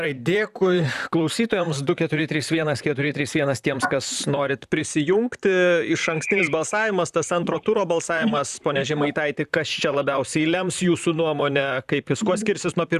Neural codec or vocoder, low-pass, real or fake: vocoder, 44.1 kHz, 128 mel bands, Pupu-Vocoder; 14.4 kHz; fake